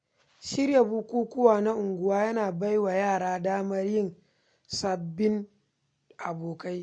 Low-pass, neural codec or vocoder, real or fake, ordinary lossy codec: 9.9 kHz; none; real; MP3, 48 kbps